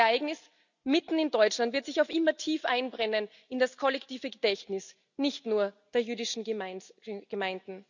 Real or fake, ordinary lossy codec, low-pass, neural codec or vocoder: real; none; 7.2 kHz; none